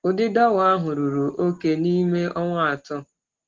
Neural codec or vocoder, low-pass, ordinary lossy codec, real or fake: none; 7.2 kHz; Opus, 16 kbps; real